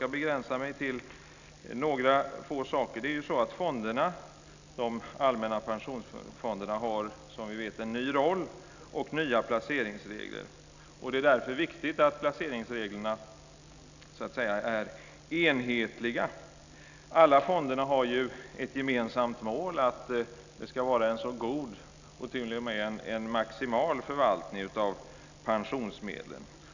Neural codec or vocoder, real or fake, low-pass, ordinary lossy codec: none; real; 7.2 kHz; none